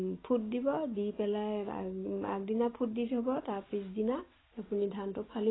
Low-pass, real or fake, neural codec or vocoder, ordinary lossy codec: 7.2 kHz; real; none; AAC, 16 kbps